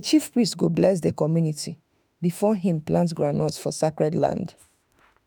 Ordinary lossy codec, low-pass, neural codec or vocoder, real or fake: none; none; autoencoder, 48 kHz, 32 numbers a frame, DAC-VAE, trained on Japanese speech; fake